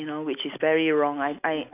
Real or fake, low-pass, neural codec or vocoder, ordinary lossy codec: real; 3.6 kHz; none; none